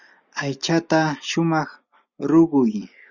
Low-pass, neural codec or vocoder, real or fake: 7.2 kHz; none; real